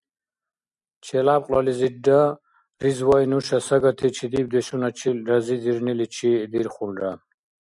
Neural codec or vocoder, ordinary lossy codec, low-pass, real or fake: none; MP3, 96 kbps; 10.8 kHz; real